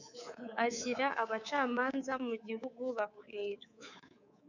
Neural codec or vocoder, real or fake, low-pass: codec, 24 kHz, 3.1 kbps, DualCodec; fake; 7.2 kHz